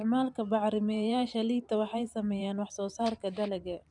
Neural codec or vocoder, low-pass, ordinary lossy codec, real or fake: vocoder, 44.1 kHz, 128 mel bands every 512 samples, BigVGAN v2; 10.8 kHz; none; fake